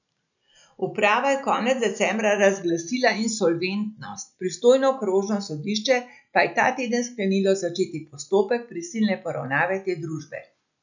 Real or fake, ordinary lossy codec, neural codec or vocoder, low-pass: real; none; none; 7.2 kHz